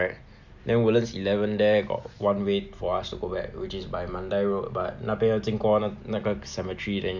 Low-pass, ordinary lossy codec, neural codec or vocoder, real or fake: 7.2 kHz; Opus, 64 kbps; none; real